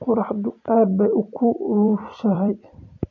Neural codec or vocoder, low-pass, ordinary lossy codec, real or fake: vocoder, 44.1 kHz, 128 mel bands every 256 samples, BigVGAN v2; 7.2 kHz; MP3, 64 kbps; fake